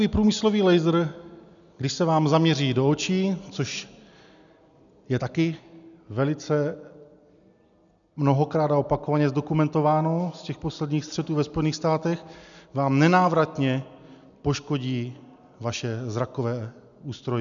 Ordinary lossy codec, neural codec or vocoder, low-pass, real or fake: MP3, 96 kbps; none; 7.2 kHz; real